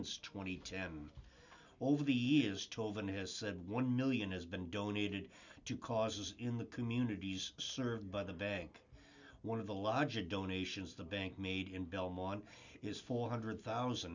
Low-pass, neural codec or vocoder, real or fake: 7.2 kHz; none; real